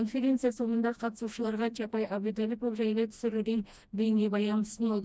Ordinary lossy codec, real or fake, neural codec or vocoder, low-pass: none; fake; codec, 16 kHz, 1 kbps, FreqCodec, smaller model; none